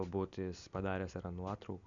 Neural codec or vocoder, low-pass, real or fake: none; 7.2 kHz; real